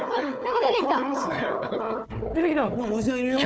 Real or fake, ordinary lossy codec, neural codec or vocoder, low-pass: fake; none; codec, 16 kHz, 4 kbps, FunCodec, trained on Chinese and English, 50 frames a second; none